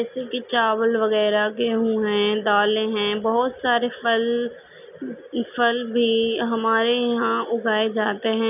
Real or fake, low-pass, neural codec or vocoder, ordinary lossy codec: real; 3.6 kHz; none; none